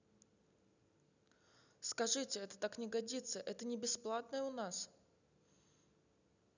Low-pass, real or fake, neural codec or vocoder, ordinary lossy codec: 7.2 kHz; real; none; none